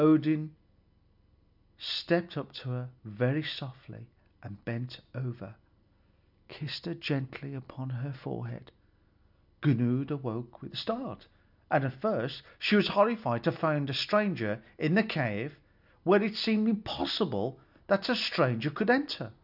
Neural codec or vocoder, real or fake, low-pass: none; real; 5.4 kHz